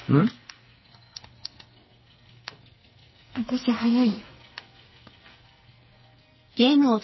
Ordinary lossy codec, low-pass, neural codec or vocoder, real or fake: MP3, 24 kbps; 7.2 kHz; codec, 44.1 kHz, 2.6 kbps, SNAC; fake